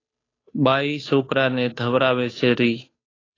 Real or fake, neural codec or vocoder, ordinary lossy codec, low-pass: fake; codec, 16 kHz, 2 kbps, FunCodec, trained on Chinese and English, 25 frames a second; AAC, 32 kbps; 7.2 kHz